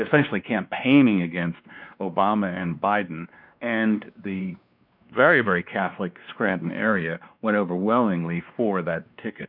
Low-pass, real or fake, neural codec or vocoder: 5.4 kHz; fake; codec, 16 kHz, 2 kbps, X-Codec, WavLM features, trained on Multilingual LibriSpeech